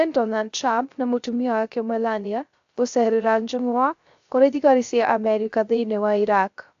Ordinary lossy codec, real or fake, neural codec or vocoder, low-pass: AAC, 64 kbps; fake; codec, 16 kHz, 0.3 kbps, FocalCodec; 7.2 kHz